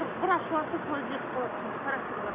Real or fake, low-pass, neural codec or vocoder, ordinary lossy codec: real; 3.6 kHz; none; Opus, 64 kbps